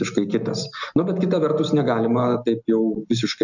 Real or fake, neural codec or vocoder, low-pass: real; none; 7.2 kHz